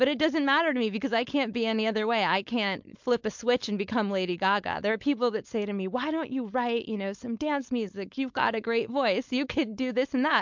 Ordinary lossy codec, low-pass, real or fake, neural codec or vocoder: MP3, 64 kbps; 7.2 kHz; fake; codec, 16 kHz, 4.8 kbps, FACodec